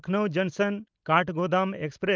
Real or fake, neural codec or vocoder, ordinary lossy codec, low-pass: real; none; Opus, 24 kbps; 7.2 kHz